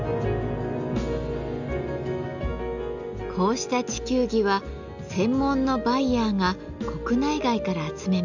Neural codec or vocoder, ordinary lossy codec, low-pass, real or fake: none; none; 7.2 kHz; real